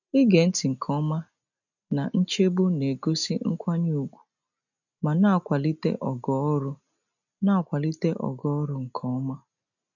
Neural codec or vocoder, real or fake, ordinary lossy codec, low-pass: none; real; none; 7.2 kHz